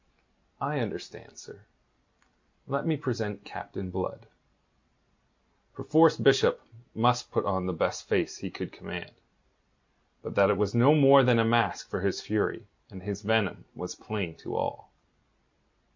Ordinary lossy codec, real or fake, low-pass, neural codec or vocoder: MP3, 64 kbps; real; 7.2 kHz; none